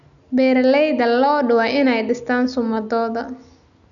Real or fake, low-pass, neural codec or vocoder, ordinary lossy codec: real; 7.2 kHz; none; none